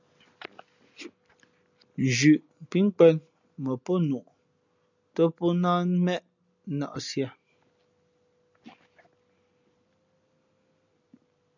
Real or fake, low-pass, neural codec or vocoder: real; 7.2 kHz; none